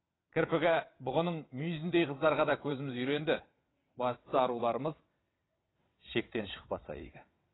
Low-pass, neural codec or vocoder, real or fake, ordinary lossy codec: 7.2 kHz; vocoder, 22.05 kHz, 80 mel bands, WaveNeXt; fake; AAC, 16 kbps